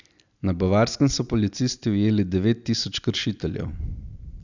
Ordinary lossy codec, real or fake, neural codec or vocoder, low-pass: none; real; none; 7.2 kHz